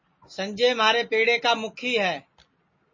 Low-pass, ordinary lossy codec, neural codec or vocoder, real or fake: 7.2 kHz; MP3, 32 kbps; none; real